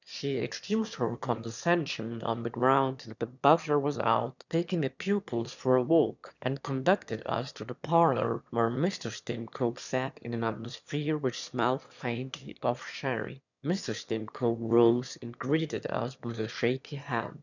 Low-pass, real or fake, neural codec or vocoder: 7.2 kHz; fake; autoencoder, 22.05 kHz, a latent of 192 numbers a frame, VITS, trained on one speaker